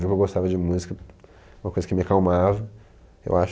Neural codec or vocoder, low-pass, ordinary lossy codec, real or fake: none; none; none; real